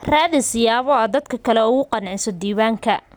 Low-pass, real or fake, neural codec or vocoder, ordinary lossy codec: none; real; none; none